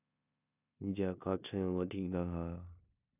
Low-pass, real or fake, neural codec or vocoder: 3.6 kHz; fake; codec, 16 kHz in and 24 kHz out, 0.9 kbps, LongCat-Audio-Codec, four codebook decoder